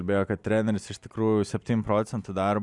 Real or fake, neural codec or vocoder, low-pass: real; none; 10.8 kHz